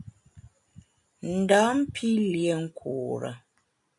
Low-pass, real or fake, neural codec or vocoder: 10.8 kHz; real; none